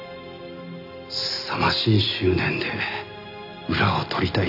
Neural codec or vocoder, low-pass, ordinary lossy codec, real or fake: none; 5.4 kHz; none; real